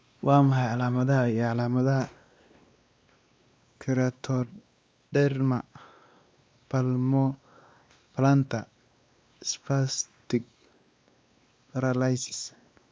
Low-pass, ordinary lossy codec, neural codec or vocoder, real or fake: none; none; codec, 16 kHz, 2 kbps, X-Codec, WavLM features, trained on Multilingual LibriSpeech; fake